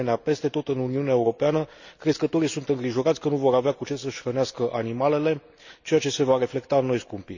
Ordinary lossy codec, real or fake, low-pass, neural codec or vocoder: none; real; 7.2 kHz; none